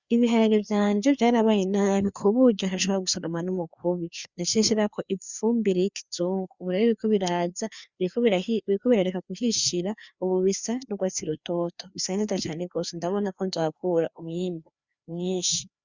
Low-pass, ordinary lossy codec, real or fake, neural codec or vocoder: 7.2 kHz; Opus, 64 kbps; fake; codec, 16 kHz, 2 kbps, FreqCodec, larger model